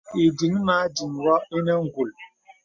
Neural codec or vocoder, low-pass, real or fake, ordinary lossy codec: none; 7.2 kHz; real; MP3, 48 kbps